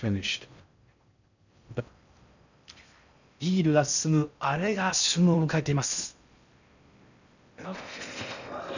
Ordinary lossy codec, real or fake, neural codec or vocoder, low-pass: none; fake; codec, 16 kHz in and 24 kHz out, 0.6 kbps, FocalCodec, streaming, 4096 codes; 7.2 kHz